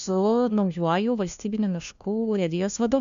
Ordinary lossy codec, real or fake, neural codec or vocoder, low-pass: MP3, 64 kbps; fake; codec, 16 kHz, 1 kbps, FunCodec, trained on LibriTTS, 50 frames a second; 7.2 kHz